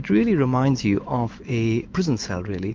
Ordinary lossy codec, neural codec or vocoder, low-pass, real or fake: Opus, 24 kbps; autoencoder, 48 kHz, 128 numbers a frame, DAC-VAE, trained on Japanese speech; 7.2 kHz; fake